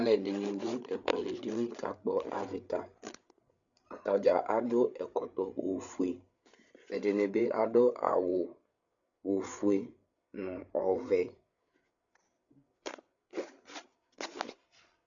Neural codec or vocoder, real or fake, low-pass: codec, 16 kHz, 8 kbps, FreqCodec, larger model; fake; 7.2 kHz